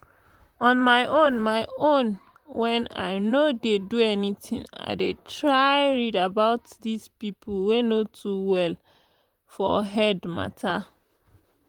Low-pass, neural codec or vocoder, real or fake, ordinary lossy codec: 19.8 kHz; vocoder, 44.1 kHz, 128 mel bands, Pupu-Vocoder; fake; Opus, 32 kbps